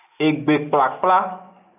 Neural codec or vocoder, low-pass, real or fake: none; 3.6 kHz; real